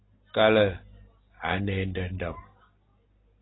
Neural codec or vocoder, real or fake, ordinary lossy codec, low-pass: none; real; AAC, 16 kbps; 7.2 kHz